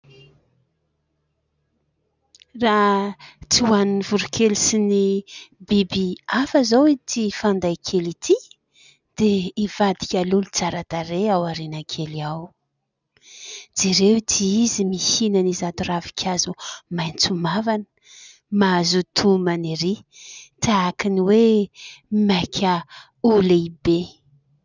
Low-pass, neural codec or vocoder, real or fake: 7.2 kHz; none; real